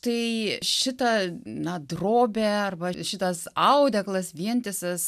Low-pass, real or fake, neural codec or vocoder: 14.4 kHz; real; none